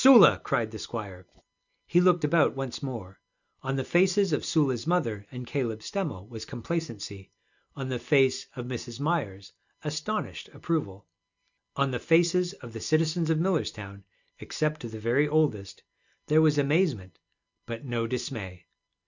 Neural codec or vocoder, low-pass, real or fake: none; 7.2 kHz; real